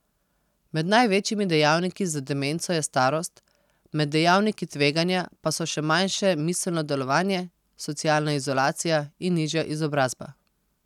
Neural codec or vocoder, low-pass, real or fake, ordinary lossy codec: none; 19.8 kHz; real; none